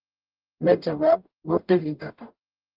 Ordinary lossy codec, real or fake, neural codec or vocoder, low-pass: Opus, 24 kbps; fake; codec, 44.1 kHz, 0.9 kbps, DAC; 5.4 kHz